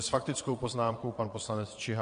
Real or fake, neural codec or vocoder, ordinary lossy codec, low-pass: fake; vocoder, 22.05 kHz, 80 mel bands, Vocos; MP3, 48 kbps; 9.9 kHz